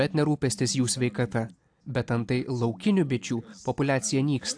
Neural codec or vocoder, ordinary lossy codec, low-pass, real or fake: none; AAC, 64 kbps; 9.9 kHz; real